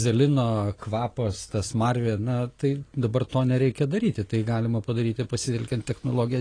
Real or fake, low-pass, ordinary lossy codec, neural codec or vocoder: real; 9.9 kHz; AAC, 32 kbps; none